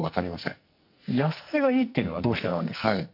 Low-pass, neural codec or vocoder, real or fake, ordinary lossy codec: 5.4 kHz; codec, 44.1 kHz, 2.6 kbps, SNAC; fake; AAC, 48 kbps